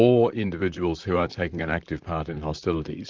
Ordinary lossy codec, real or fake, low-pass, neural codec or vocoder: Opus, 24 kbps; fake; 7.2 kHz; vocoder, 44.1 kHz, 128 mel bands, Pupu-Vocoder